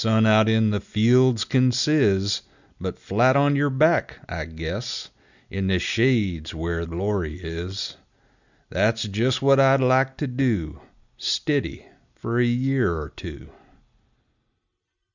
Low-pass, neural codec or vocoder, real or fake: 7.2 kHz; none; real